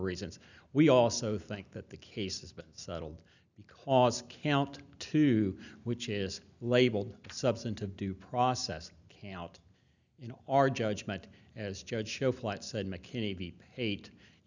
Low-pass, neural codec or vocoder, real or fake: 7.2 kHz; none; real